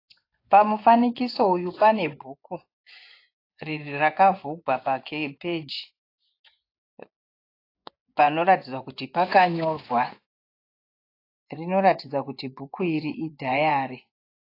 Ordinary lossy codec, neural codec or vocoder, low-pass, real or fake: AAC, 32 kbps; vocoder, 24 kHz, 100 mel bands, Vocos; 5.4 kHz; fake